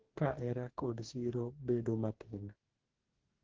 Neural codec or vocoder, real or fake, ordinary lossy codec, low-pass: codec, 44.1 kHz, 2.6 kbps, DAC; fake; Opus, 16 kbps; 7.2 kHz